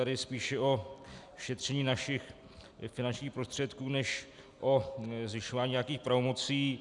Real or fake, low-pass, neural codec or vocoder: real; 10.8 kHz; none